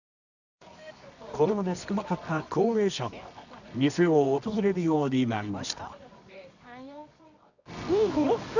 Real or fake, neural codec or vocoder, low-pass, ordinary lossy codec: fake; codec, 24 kHz, 0.9 kbps, WavTokenizer, medium music audio release; 7.2 kHz; none